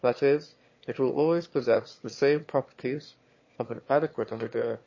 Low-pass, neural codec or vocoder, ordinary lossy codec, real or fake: 7.2 kHz; autoencoder, 22.05 kHz, a latent of 192 numbers a frame, VITS, trained on one speaker; MP3, 32 kbps; fake